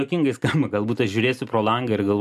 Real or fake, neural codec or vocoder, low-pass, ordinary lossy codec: real; none; 14.4 kHz; MP3, 96 kbps